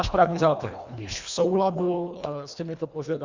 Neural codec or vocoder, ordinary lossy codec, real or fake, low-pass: codec, 24 kHz, 1.5 kbps, HILCodec; Opus, 64 kbps; fake; 7.2 kHz